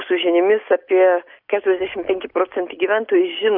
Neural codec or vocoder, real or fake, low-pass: none; real; 5.4 kHz